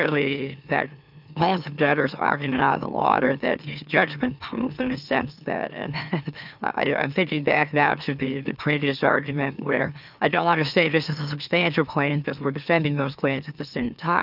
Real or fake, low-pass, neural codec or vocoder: fake; 5.4 kHz; autoencoder, 44.1 kHz, a latent of 192 numbers a frame, MeloTTS